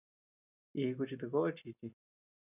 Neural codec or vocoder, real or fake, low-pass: none; real; 3.6 kHz